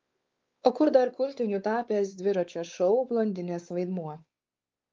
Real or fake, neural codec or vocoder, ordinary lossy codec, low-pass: fake; codec, 16 kHz, 4 kbps, X-Codec, WavLM features, trained on Multilingual LibriSpeech; Opus, 24 kbps; 7.2 kHz